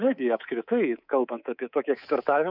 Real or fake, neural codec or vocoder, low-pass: fake; codec, 24 kHz, 3.1 kbps, DualCodec; 5.4 kHz